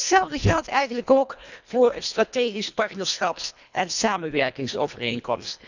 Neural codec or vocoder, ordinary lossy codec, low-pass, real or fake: codec, 24 kHz, 1.5 kbps, HILCodec; none; 7.2 kHz; fake